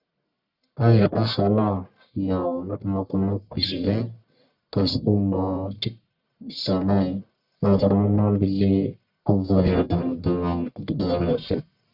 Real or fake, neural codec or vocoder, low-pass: fake; codec, 44.1 kHz, 1.7 kbps, Pupu-Codec; 5.4 kHz